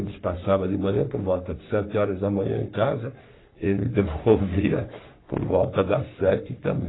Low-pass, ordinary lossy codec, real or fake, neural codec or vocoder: 7.2 kHz; AAC, 16 kbps; fake; codec, 44.1 kHz, 3.4 kbps, Pupu-Codec